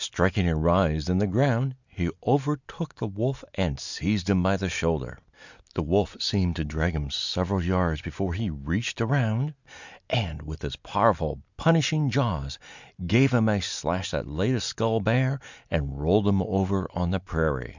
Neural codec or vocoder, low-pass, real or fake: none; 7.2 kHz; real